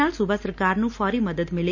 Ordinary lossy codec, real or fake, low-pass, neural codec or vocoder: none; real; 7.2 kHz; none